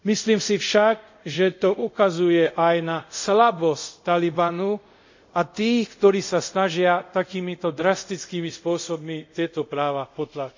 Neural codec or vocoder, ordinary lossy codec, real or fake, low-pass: codec, 24 kHz, 0.5 kbps, DualCodec; MP3, 64 kbps; fake; 7.2 kHz